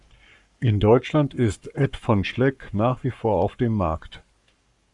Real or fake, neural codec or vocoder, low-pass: fake; codec, 44.1 kHz, 7.8 kbps, Pupu-Codec; 10.8 kHz